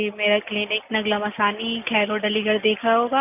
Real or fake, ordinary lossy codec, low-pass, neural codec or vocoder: real; none; 3.6 kHz; none